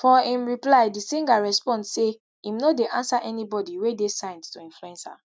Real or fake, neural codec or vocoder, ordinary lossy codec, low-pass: real; none; none; none